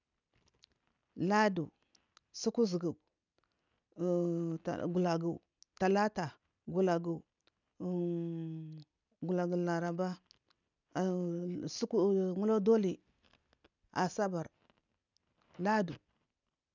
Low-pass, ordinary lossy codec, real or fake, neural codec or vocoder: 7.2 kHz; none; real; none